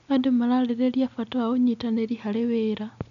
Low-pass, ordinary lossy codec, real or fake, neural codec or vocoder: 7.2 kHz; none; real; none